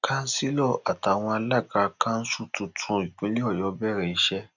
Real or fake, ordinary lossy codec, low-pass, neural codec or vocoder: real; none; 7.2 kHz; none